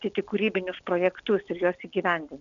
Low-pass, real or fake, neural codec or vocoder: 7.2 kHz; real; none